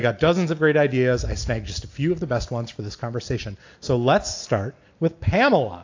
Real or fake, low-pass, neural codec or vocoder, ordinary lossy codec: real; 7.2 kHz; none; AAC, 48 kbps